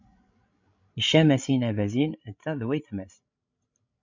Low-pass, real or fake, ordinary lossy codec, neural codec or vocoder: 7.2 kHz; fake; MP3, 64 kbps; codec, 16 kHz, 16 kbps, FreqCodec, larger model